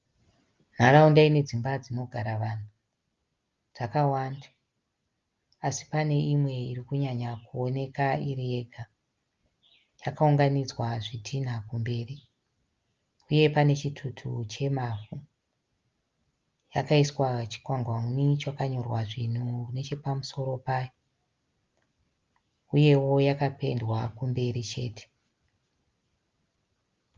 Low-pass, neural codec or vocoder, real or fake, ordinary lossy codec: 7.2 kHz; none; real; Opus, 32 kbps